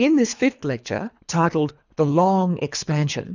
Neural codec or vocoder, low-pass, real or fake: codec, 24 kHz, 3 kbps, HILCodec; 7.2 kHz; fake